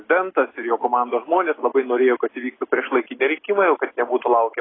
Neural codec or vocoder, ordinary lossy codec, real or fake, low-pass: none; AAC, 16 kbps; real; 7.2 kHz